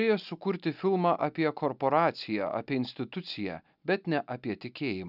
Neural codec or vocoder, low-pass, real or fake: none; 5.4 kHz; real